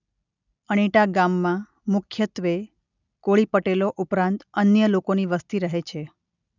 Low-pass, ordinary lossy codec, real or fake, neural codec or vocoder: 7.2 kHz; none; real; none